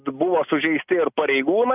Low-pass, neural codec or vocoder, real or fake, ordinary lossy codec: 3.6 kHz; none; real; AAC, 32 kbps